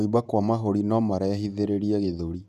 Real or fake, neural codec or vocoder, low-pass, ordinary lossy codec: fake; vocoder, 48 kHz, 128 mel bands, Vocos; 14.4 kHz; none